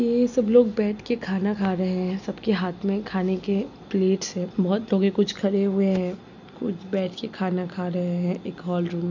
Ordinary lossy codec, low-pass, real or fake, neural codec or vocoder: none; 7.2 kHz; real; none